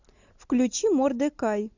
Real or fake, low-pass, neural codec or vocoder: real; 7.2 kHz; none